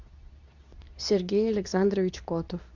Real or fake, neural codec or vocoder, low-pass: fake; codec, 24 kHz, 0.9 kbps, WavTokenizer, medium speech release version 2; 7.2 kHz